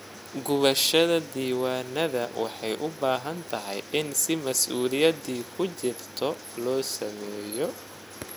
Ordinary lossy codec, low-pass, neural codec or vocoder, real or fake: none; none; none; real